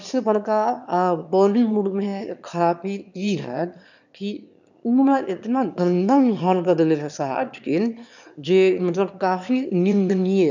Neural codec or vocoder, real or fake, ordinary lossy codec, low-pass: autoencoder, 22.05 kHz, a latent of 192 numbers a frame, VITS, trained on one speaker; fake; none; 7.2 kHz